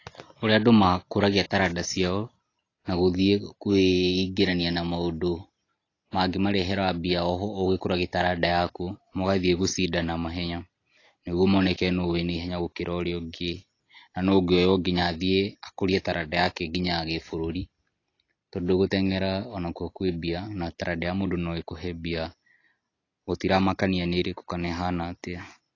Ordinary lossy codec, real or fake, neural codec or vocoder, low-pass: AAC, 32 kbps; real; none; 7.2 kHz